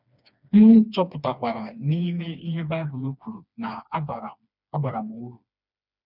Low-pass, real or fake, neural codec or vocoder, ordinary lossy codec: 5.4 kHz; fake; codec, 16 kHz, 2 kbps, FreqCodec, smaller model; none